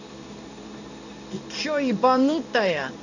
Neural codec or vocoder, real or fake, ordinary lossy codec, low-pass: codec, 16 kHz in and 24 kHz out, 1 kbps, XY-Tokenizer; fake; none; 7.2 kHz